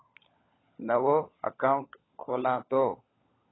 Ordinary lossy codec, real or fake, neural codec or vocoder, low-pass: AAC, 16 kbps; fake; codec, 16 kHz, 16 kbps, FunCodec, trained on LibriTTS, 50 frames a second; 7.2 kHz